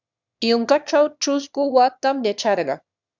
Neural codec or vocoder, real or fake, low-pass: autoencoder, 22.05 kHz, a latent of 192 numbers a frame, VITS, trained on one speaker; fake; 7.2 kHz